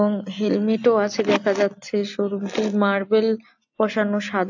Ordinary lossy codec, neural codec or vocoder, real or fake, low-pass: AAC, 48 kbps; none; real; 7.2 kHz